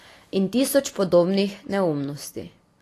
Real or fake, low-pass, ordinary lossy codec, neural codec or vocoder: real; 14.4 kHz; AAC, 48 kbps; none